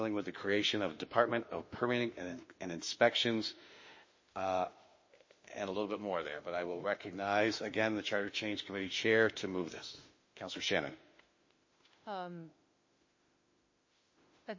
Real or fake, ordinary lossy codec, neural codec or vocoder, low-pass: fake; MP3, 32 kbps; autoencoder, 48 kHz, 32 numbers a frame, DAC-VAE, trained on Japanese speech; 7.2 kHz